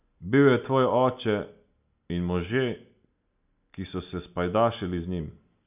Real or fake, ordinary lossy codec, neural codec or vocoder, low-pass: real; none; none; 3.6 kHz